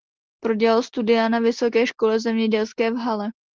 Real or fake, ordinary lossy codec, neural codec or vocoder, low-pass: real; Opus, 16 kbps; none; 7.2 kHz